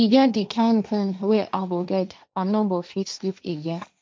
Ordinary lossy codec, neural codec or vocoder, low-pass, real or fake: none; codec, 16 kHz, 1.1 kbps, Voila-Tokenizer; none; fake